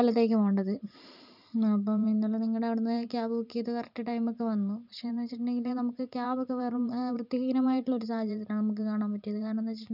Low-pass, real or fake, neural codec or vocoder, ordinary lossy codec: 5.4 kHz; fake; vocoder, 44.1 kHz, 128 mel bands every 512 samples, BigVGAN v2; none